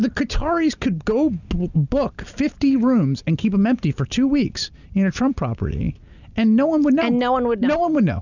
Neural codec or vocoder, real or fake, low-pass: none; real; 7.2 kHz